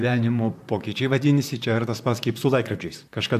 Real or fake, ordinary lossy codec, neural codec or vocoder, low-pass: fake; MP3, 96 kbps; vocoder, 44.1 kHz, 128 mel bands, Pupu-Vocoder; 14.4 kHz